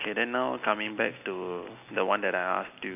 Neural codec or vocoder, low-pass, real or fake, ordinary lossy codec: none; 3.6 kHz; real; none